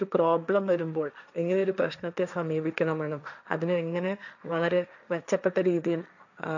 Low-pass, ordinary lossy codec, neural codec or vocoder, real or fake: 7.2 kHz; none; codec, 16 kHz, 1.1 kbps, Voila-Tokenizer; fake